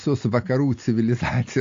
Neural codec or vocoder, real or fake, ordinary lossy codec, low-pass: none; real; AAC, 48 kbps; 7.2 kHz